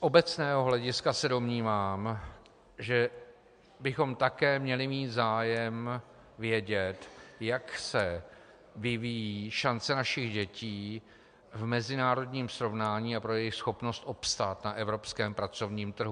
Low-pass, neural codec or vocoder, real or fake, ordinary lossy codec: 9.9 kHz; none; real; MP3, 64 kbps